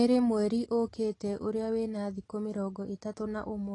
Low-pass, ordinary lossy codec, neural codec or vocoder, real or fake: 10.8 kHz; AAC, 32 kbps; none; real